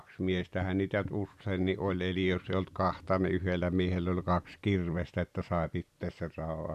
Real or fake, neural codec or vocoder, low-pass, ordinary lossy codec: real; none; 14.4 kHz; none